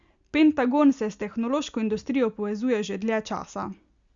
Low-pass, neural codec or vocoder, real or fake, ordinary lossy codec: 7.2 kHz; none; real; Opus, 64 kbps